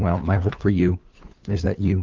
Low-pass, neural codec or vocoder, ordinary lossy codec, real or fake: 7.2 kHz; codec, 16 kHz, 2 kbps, FunCodec, trained on LibriTTS, 25 frames a second; Opus, 24 kbps; fake